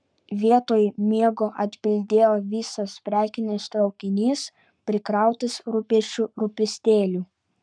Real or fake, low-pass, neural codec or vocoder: fake; 9.9 kHz; codec, 44.1 kHz, 7.8 kbps, Pupu-Codec